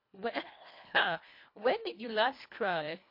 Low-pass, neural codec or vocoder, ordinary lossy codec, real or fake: 5.4 kHz; codec, 24 kHz, 1.5 kbps, HILCodec; MP3, 32 kbps; fake